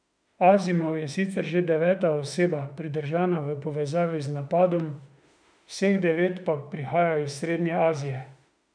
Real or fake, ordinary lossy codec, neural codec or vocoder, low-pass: fake; none; autoencoder, 48 kHz, 32 numbers a frame, DAC-VAE, trained on Japanese speech; 9.9 kHz